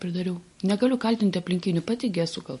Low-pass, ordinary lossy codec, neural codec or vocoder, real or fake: 14.4 kHz; MP3, 48 kbps; none; real